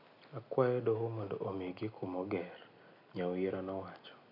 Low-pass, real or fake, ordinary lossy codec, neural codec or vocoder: 5.4 kHz; real; none; none